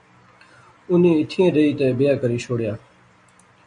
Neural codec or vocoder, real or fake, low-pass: none; real; 9.9 kHz